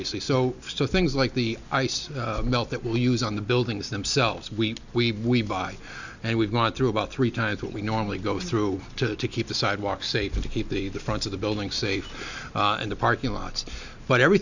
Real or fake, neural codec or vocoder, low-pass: real; none; 7.2 kHz